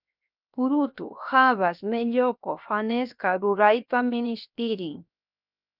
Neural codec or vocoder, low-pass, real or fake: codec, 16 kHz, 0.7 kbps, FocalCodec; 5.4 kHz; fake